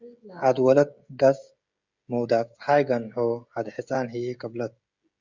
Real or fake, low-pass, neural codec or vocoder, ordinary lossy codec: fake; 7.2 kHz; codec, 16 kHz, 16 kbps, FreqCodec, smaller model; Opus, 64 kbps